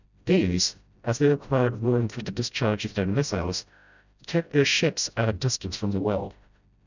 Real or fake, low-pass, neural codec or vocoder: fake; 7.2 kHz; codec, 16 kHz, 0.5 kbps, FreqCodec, smaller model